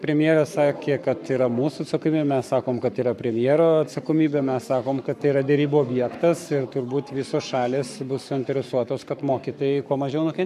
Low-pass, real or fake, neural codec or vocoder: 14.4 kHz; fake; codec, 44.1 kHz, 7.8 kbps, Pupu-Codec